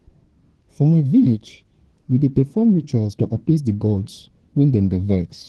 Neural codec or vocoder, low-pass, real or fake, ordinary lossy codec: codec, 24 kHz, 1 kbps, SNAC; 10.8 kHz; fake; Opus, 16 kbps